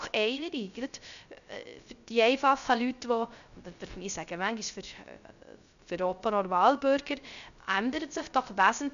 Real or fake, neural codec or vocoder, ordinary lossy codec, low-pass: fake; codec, 16 kHz, 0.3 kbps, FocalCodec; none; 7.2 kHz